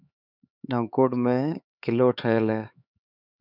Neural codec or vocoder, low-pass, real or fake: codec, 16 kHz, 4 kbps, X-Codec, HuBERT features, trained on LibriSpeech; 5.4 kHz; fake